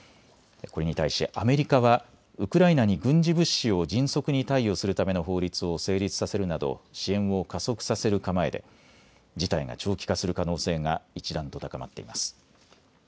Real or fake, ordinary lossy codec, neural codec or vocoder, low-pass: real; none; none; none